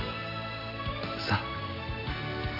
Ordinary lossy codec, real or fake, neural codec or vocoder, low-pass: none; real; none; 5.4 kHz